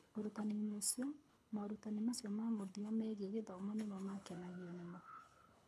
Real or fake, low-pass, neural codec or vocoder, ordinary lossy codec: fake; none; codec, 24 kHz, 6 kbps, HILCodec; none